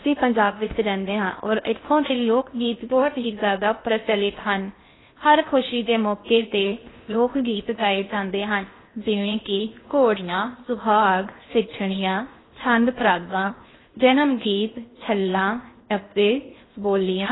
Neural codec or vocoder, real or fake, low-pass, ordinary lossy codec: codec, 16 kHz in and 24 kHz out, 0.6 kbps, FocalCodec, streaming, 2048 codes; fake; 7.2 kHz; AAC, 16 kbps